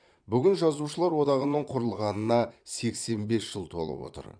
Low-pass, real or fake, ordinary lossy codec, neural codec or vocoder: 9.9 kHz; fake; none; vocoder, 22.05 kHz, 80 mel bands, Vocos